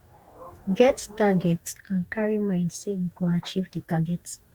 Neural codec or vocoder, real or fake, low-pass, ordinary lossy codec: codec, 44.1 kHz, 2.6 kbps, DAC; fake; 19.8 kHz; Opus, 64 kbps